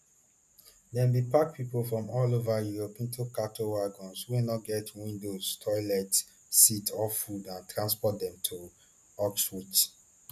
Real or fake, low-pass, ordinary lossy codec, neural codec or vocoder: real; 14.4 kHz; none; none